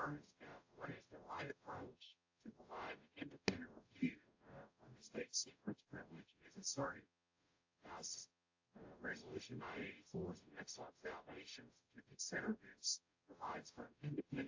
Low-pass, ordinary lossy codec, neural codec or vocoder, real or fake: 7.2 kHz; AAC, 48 kbps; codec, 44.1 kHz, 0.9 kbps, DAC; fake